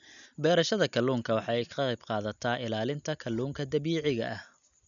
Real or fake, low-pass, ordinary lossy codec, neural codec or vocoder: real; 7.2 kHz; none; none